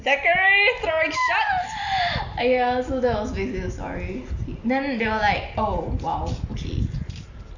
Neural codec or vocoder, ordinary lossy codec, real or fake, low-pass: none; none; real; 7.2 kHz